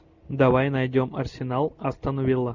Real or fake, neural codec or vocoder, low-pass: real; none; 7.2 kHz